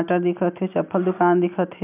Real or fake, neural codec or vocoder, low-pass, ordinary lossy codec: real; none; 3.6 kHz; none